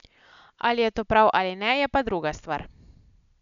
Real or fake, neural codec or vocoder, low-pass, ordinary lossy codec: real; none; 7.2 kHz; none